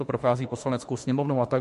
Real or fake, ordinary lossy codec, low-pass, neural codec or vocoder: fake; MP3, 48 kbps; 14.4 kHz; autoencoder, 48 kHz, 32 numbers a frame, DAC-VAE, trained on Japanese speech